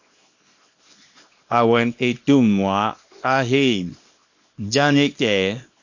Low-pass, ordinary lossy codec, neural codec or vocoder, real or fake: 7.2 kHz; MP3, 48 kbps; codec, 24 kHz, 0.9 kbps, WavTokenizer, small release; fake